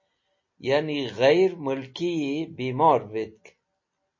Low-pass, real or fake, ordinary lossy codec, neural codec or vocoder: 7.2 kHz; real; MP3, 32 kbps; none